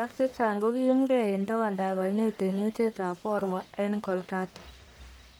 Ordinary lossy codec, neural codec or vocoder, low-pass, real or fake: none; codec, 44.1 kHz, 1.7 kbps, Pupu-Codec; none; fake